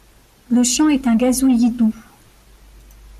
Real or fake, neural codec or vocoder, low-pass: real; none; 14.4 kHz